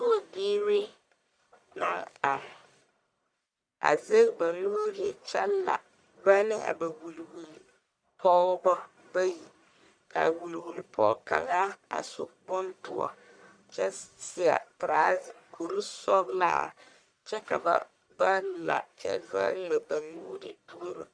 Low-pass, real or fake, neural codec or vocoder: 9.9 kHz; fake; codec, 44.1 kHz, 1.7 kbps, Pupu-Codec